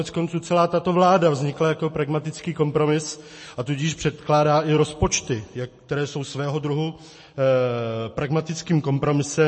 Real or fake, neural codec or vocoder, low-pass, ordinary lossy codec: real; none; 10.8 kHz; MP3, 32 kbps